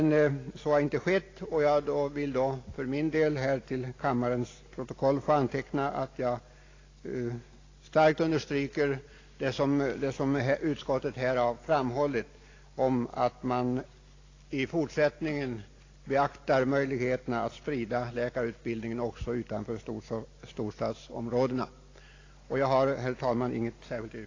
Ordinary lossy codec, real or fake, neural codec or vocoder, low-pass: AAC, 32 kbps; real; none; 7.2 kHz